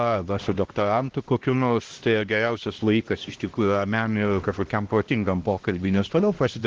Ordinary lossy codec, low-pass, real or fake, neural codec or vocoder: Opus, 16 kbps; 7.2 kHz; fake; codec, 16 kHz, 1 kbps, X-Codec, HuBERT features, trained on LibriSpeech